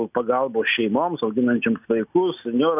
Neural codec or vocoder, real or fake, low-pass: none; real; 3.6 kHz